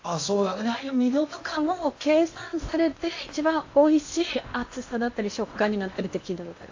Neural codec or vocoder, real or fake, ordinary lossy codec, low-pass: codec, 16 kHz in and 24 kHz out, 0.8 kbps, FocalCodec, streaming, 65536 codes; fake; AAC, 48 kbps; 7.2 kHz